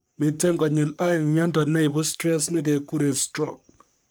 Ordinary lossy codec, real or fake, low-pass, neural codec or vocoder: none; fake; none; codec, 44.1 kHz, 3.4 kbps, Pupu-Codec